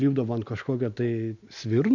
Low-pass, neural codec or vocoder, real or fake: 7.2 kHz; none; real